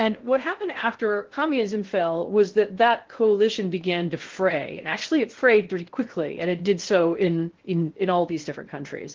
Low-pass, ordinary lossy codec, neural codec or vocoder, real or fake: 7.2 kHz; Opus, 16 kbps; codec, 16 kHz in and 24 kHz out, 0.6 kbps, FocalCodec, streaming, 2048 codes; fake